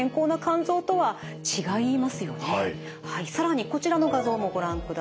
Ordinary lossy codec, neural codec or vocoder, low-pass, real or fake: none; none; none; real